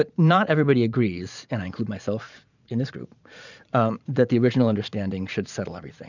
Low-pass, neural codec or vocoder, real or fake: 7.2 kHz; vocoder, 44.1 kHz, 80 mel bands, Vocos; fake